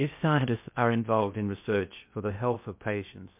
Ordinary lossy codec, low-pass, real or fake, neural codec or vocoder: MP3, 32 kbps; 3.6 kHz; fake; codec, 16 kHz in and 24 kHz out, 0.6 kbps, FocalCodec, streaming, 2048 codes